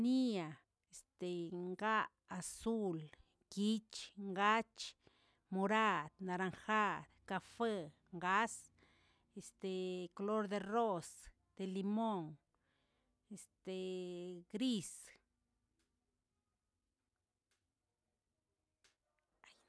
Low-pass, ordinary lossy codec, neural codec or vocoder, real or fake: none; none; none; real